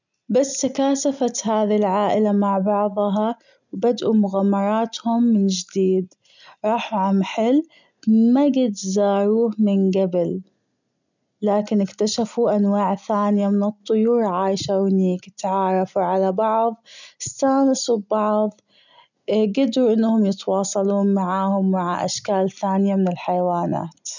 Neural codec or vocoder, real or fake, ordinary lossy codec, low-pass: none; real; none; 7.2 kHz